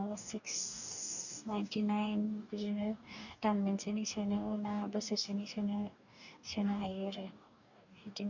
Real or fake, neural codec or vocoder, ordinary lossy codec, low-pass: fake; codec, 44.1 kHz, 2.6 kbps, DAC; none; 7.2 kHz